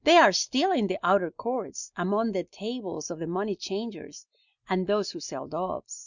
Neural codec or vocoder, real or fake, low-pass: none; real; 7.2 kHz